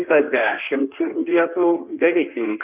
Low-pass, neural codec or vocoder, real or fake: 3.6 kHz; codec, 16 kHz in and 24 kHz out, 1.1 kbps, FireRedTTS-2 codec; fake